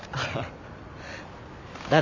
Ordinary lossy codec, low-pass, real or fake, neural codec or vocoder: AAC, 48 kbps; 7.2 kHz; real; none